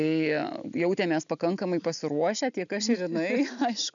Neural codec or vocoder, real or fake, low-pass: none; real; 7.2 kHz